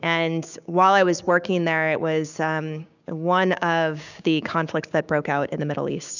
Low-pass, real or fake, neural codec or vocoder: 7.2 kHz; fake; codec, 16 kHz, 6 kbps, DAC